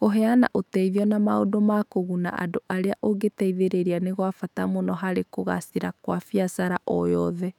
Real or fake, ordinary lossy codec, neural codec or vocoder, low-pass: fake; none; autoencoder, 48 kHz, 128 numbers a frame, DAC-VAE, trained on Japanese speech; 19.8 kHz